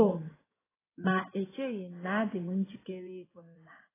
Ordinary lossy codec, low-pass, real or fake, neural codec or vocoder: AAC, 16 kbps; 3.6 kHz; fake; codec, 16 kHz in and 24 kHz out, 2.2 kbps, FireRedTTS-2 codec